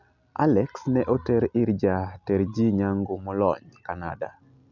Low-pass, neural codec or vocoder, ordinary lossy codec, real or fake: 7.2 kHz; none; none; real